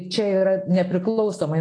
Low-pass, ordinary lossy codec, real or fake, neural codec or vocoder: 9.9 kHz; AAC, 48 kbps; real; none